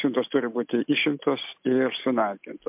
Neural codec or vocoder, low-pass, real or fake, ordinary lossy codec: none; 3.6 kHz; real; MP3, 32 kbps